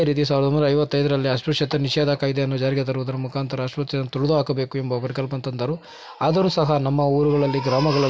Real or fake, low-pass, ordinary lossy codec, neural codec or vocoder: real; none; none; none